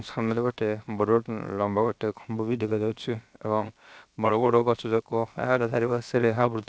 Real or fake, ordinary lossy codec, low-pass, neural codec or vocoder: fake; none; none; codec, 16 kHz, about 1 kbps, DyCAST, with the encoder's durations